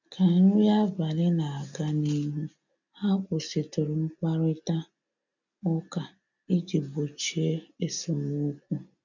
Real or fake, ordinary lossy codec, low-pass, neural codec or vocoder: real; none; 7.2 kHz; none